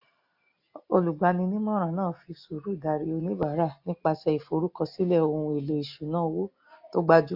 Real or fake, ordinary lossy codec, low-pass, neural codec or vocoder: real; AAC, 48 kbps; 5.4 kHz; none